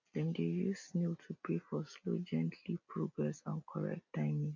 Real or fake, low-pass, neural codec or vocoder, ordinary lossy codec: real; 7.2 kHz; none; MP3, 64 kbps